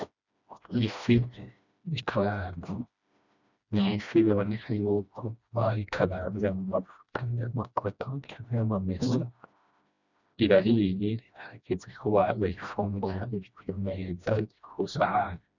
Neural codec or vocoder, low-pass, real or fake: codec, 16 kHz, 1 kbps, FreqCodec, smaller model; 7.2 kHz; fake